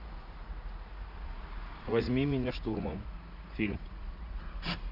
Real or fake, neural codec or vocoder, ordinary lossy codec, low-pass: fake; vocoder, 44.1 kHz, 80 mel bands, Vocos; none; 5.4 kHz